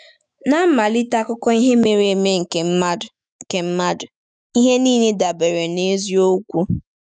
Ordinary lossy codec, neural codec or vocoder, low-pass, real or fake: none; autoencoder, 48 kHz, 128 numbers a frame, DAC-VAE, trained on Japanese speech; 9.9 kHz; fake